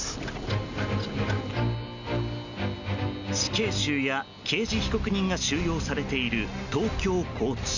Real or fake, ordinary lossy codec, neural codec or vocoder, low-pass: real; none; none; 7.2 kHz